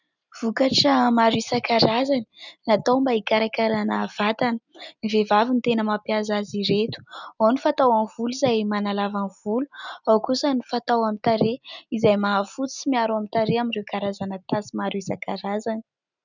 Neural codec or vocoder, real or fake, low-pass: none; real; 7.2 kHz